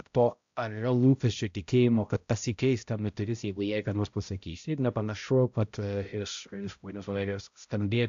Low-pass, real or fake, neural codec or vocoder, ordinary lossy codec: 7.2 kHz; fake; codec, 16 kHz, 0.5 kbps, X-Codec, HuBERT features, trained on balanced general audio; AAC, 64 kbps